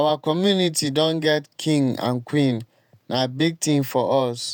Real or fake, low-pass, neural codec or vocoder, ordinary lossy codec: fake; none; vocoder, 48 kHz, 128 mel bands, Vocos; none